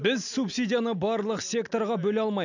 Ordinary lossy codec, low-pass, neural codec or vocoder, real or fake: none; 7.2 kHz; none; real